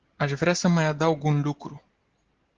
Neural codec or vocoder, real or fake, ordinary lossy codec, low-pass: none; real; Opus, 16 kbps; 7.2 kHz